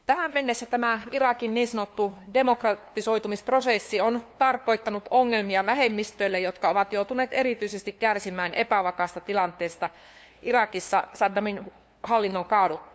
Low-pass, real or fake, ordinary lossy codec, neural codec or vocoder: none; fake; none; codec, 16 kHz, 2 kbps, FunCodec, trained on LibriTTS, 25 frames a second